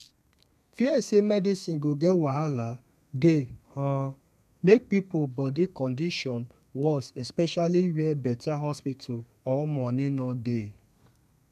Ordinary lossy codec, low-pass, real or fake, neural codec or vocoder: none; 14.4 kHz; fake; codec, 32 kHz, 1.9 kbps, SNAC